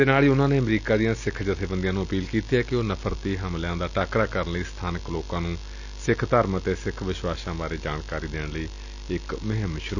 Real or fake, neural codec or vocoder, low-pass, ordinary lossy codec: real; none; 7.2 kHz; none